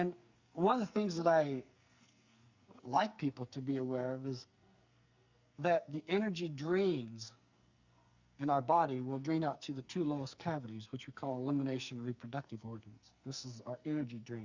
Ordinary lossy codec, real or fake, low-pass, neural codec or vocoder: Opus, 64 kbps; fake; 7.2 kHz; codec, 44.1 kHz, 2.6 kbps, SNAC